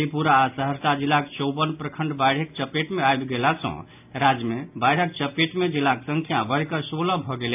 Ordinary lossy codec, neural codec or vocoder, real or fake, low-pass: AAC, 32 kbps; none; real; 3.6 kHz